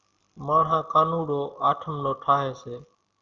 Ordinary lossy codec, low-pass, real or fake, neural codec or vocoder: Opus, 24 kbps; 7.2 kHz; real; none